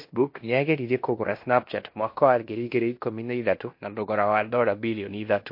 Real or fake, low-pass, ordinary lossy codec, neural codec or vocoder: fake; 5.4 kHz; MP3, 32 kbps; codec, 16 kHz in and 24 kHz out, 0.9 kbps, LongCat-Audio-Codec, fine tuned four codebook decoder